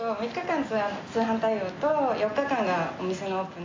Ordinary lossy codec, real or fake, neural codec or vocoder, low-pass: AAC, 32 kbps; real; none; 7.2 kHz